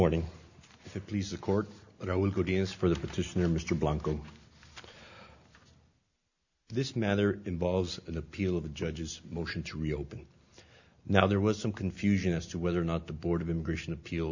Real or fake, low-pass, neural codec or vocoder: real; 7.2 kHz; none